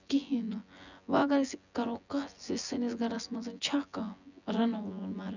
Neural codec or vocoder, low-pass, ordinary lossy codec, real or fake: vocoder, 24 kHz, 100 mel bands, Vocos; 7.2 kHz; none; fake